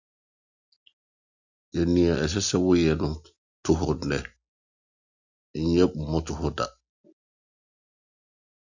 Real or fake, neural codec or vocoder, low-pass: real; none; 7.2 kHz